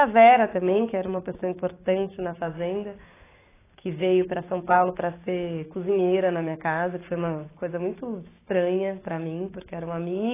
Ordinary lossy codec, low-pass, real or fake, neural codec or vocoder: AAC, 16 kbps; 3.6 kHz; fake; codec, 16 kHz, 6 kbps, DAC